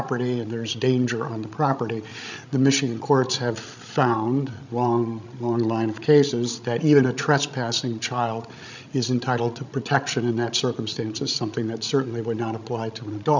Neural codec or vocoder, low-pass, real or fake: codec, 16 kHz, 16 kbps, FreqCodec, larger model; 7.2 kHz; fake